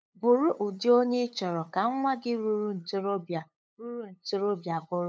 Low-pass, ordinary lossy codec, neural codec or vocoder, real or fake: none; none; codec, 16 kHz, 8 kbps, FunCodec, trained on LibriTTS, 25 frames a second; fake